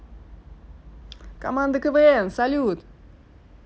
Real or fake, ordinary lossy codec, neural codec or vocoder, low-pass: real; none; none; none